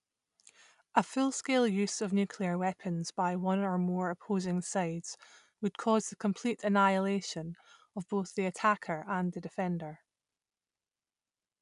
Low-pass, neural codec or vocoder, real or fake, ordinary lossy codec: 10.8 kHz; none; real; none